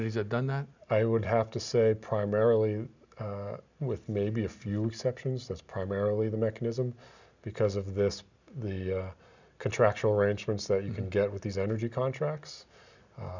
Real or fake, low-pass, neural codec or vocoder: real; 7.2 kHz; none